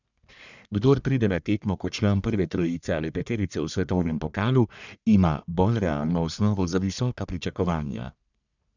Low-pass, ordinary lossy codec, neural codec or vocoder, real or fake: 7.2 kHz; none; codec, 44.1 kHz, 1.7 kbps, Pupu-Codec; fake